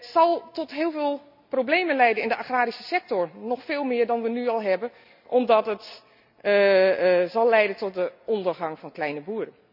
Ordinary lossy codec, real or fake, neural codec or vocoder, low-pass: none; real; none; 5.4 kHz